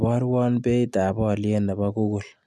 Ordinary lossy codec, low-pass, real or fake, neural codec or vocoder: none; none; real; none